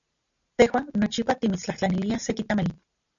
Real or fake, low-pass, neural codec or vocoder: real; 7.2 kHz; none